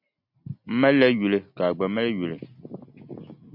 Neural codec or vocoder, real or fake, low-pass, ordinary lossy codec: none; real; 5.4 kHz; MP3, 48 kbps